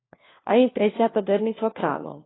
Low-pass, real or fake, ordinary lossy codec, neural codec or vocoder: 7.2 kHz; fake; AAC, 16 kbps; codec, 16 kHz, 1 kbps, FunCodec, trained on LibriTTS, 50 frames a second